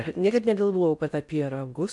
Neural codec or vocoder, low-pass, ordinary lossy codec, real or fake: codec, 16 kHz in and 24 kHz out, 0.6 kbps, FocalCodec, streaming, 4096 codes; 10.8 kHz; MP3, 96 kbps; fake